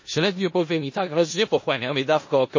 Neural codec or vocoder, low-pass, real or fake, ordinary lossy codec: codec, 16 kHz in and 24 kHz out, 0.4 kbps, LongCat-Audio-Codec, four codebook decoder; 7.2 kHz; fake; MP3, 32 kbps